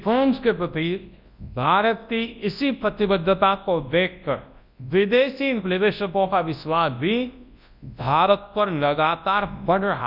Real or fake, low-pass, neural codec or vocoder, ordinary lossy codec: fake; 5.4 kHz; codec, 24 kHz, 0.9 kbps, WavTokenizer, large speech release; none